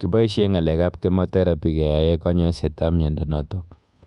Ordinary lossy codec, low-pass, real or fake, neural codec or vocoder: Opus, 64 kbps; 10.8 kHz; fake; codec, 24 kHz, 1.2 kbps, DualCodec